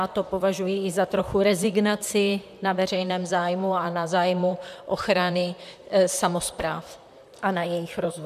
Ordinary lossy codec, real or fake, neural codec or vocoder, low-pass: MP3, 96 kbps; fake; vocoder, 44.1 kHz, 128 mel bands, Pupu-Vocoder; 14.4 kHz